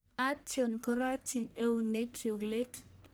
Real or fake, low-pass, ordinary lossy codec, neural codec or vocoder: fake; none; none; codec, 44.1 kHz, 1.7 kbps, Pupu-Codec